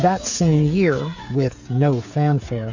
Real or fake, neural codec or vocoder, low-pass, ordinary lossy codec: fake; codec, 16 kHz, 8 kbps, FreqCodec, smaller model; 7.2 kHz; Opus, 64 kbps